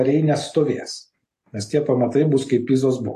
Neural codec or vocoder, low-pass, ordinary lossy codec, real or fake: none; 14.4 kHz; AAC, 48 kbps; real